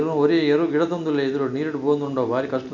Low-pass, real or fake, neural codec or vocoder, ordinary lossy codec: 7.2 kHz; real; none; none